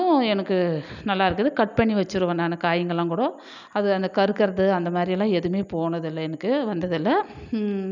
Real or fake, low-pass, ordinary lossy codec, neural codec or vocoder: real; 7.2 kHz; none; none